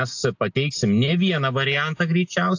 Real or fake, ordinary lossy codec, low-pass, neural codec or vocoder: real; AAC, 48 kbps; 7.2 kHz; none